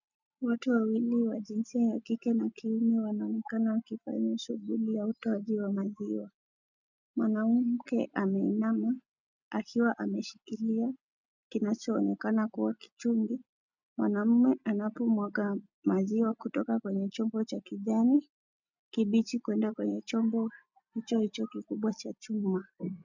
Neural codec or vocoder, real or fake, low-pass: none; real; 7.2 kHz